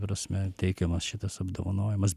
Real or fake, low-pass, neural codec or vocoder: real; 14.4 kHz; none